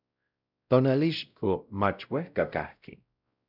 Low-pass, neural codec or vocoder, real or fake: 5.4 kHz; codec, 16 kHz, 0.5 kbps, X-Codec, WavLM features, trained on Multilingual LibriSpeech; fake